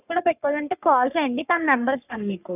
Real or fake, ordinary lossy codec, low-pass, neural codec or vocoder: fake; none; 3.6 kHz; codec, 44.1 kHz, 3.4 kbps, Pupu-Codec